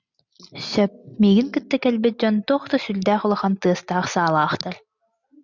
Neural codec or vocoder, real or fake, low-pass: none; real; 7.2 kHz